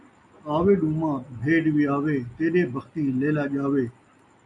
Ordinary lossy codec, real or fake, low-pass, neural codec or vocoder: AAC, 64 kbps; real; 10.8 kHz; none